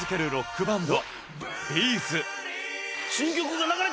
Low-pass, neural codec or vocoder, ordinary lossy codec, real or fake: none; none; none; real